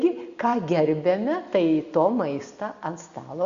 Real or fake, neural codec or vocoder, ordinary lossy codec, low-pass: real; none; Opus, 64 kbps; 7.2 kHz